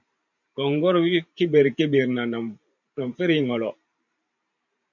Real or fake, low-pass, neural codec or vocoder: fake; 7.2 kHz; vocoder, 44.1 kHz, 128 mel bands every 512 samples, BigVGAN v2